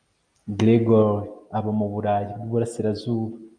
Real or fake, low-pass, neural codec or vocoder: real; 9.9 kHz; none